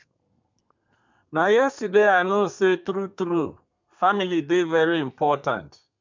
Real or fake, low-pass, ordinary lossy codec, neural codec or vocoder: fake; 7.2 kHz; MP3, 64 kbps; codec, 32 kHz, 1.9 kbps, SNAC